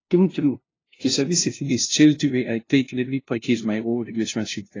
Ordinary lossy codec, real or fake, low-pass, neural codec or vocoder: AAC, 32 kbps; fake; 7.2 kHz; codec, 16 kHz, 0.5 kbps, FunCodec, trained on LibriTTS, 25 frames a second